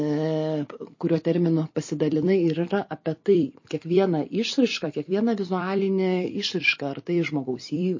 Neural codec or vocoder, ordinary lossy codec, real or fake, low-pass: vocoder, 44.1 kHz, 128 mel bands every 256 samples, BigVGAN v2; MP3, 32 kbps; fake; 7.2 kHz